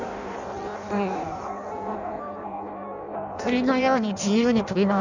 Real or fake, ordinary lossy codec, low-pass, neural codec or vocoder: fake; none; 7.2 kHz; codec, 16 kHz in and 24 kHz out, 0.6 kbps, FireRedTTS-2 codec